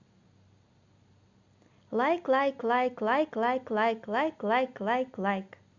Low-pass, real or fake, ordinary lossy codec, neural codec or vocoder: 7.2 kHz; real; Opus, 64 kbps; none